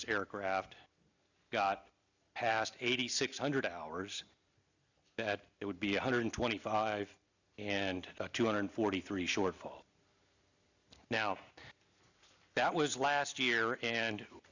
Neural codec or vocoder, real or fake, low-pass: none; real; 7.2 kHz